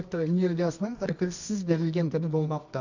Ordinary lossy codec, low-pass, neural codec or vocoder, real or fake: none; 7.2 kHz; codec, 24 kHz, 0.9 kbps, WavTokenizer, medium music audio release; fake